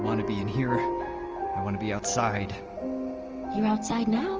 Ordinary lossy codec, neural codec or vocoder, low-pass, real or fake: Opus, 24 kbps; none; 7.2 kHz; real